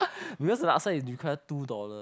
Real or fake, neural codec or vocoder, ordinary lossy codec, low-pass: real; none; none; none